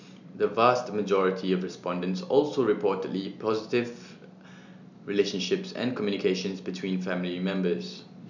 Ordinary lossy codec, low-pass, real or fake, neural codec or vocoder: none; 7.2 kHz; real; none